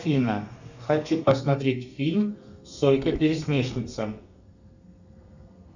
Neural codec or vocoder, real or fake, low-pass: codec, 32 kHz, 1.9 kbps, SNAC; fake; 7.2 kHz